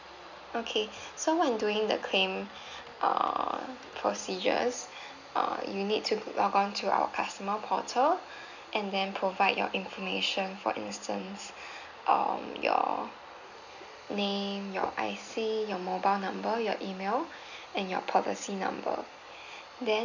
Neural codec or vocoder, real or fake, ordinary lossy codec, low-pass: none; real; none; 7.2 kHz